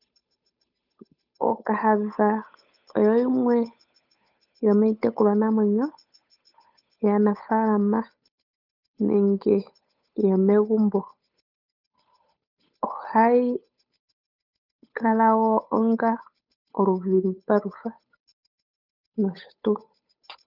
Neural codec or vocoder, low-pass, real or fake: codec, 16 kHz, 8 kbps, FunCodec, trained on Chinese and English, 25 frames a second; 5.4 kHz; fake